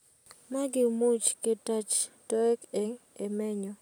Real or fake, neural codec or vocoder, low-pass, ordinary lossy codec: real; none; none; none